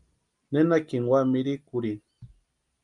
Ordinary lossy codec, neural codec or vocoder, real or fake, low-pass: Opus, 32 kbps; none; real; 10.8 kHz